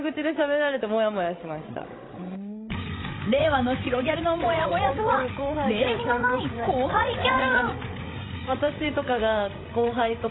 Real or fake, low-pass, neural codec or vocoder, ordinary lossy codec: fake; 7.2 kHz; codec, 16 kHz, 16 kbps, FreqCodec, larger model; AAC, 16 kbps